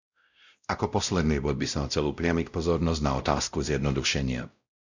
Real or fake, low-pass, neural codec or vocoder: fake; 7.2 kHz; codec, 16 kHz, 0.5 kbps, X-Codec, WavLM features, trained on Multilingual LibriSpeech